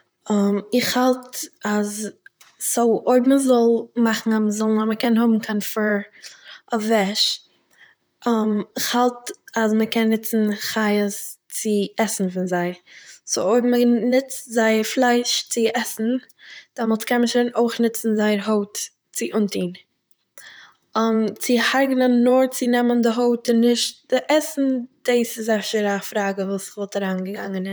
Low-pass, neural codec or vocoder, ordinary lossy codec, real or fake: none; vocoder, 44.1 kHz, 128 mel bands, Pupu-Vocoder; none; fake